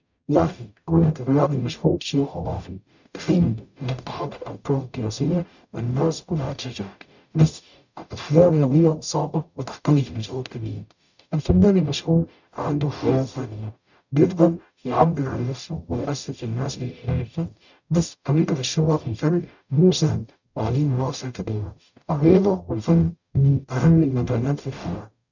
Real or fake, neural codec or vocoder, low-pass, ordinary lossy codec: fake; codec, 44.1 kHz, 0.9 kbps, DAC; 7.2 kHz; none